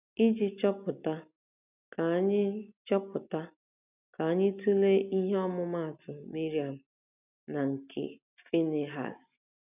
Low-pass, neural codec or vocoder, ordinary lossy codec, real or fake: 3.6 kHz; none; none; real